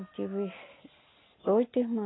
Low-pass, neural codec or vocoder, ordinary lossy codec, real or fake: 7.2 kHz; none; AAC, 16 kbps; real